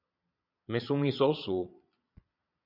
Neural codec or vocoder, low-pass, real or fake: vocoder, 22.05 kHz, 80 mel bands, Vocos; 5.4 kHz; fake